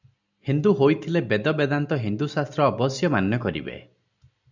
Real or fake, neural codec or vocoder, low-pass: real; none; 7.2 kHz